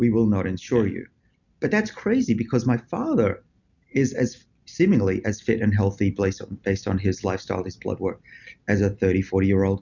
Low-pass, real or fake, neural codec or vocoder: 7.2 kHz; real; none